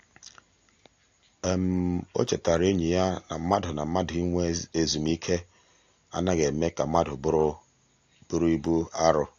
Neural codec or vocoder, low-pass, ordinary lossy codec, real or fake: none; 7.2 kHz; AAC, 48 kbps; real